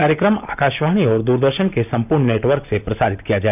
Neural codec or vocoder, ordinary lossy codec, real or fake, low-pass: none; none; real; 3.6 kHz